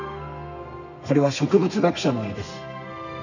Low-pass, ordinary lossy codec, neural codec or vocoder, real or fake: 7.2 kHz; none; codec, 32 kHz, 1.9 kbps, SNAC; fake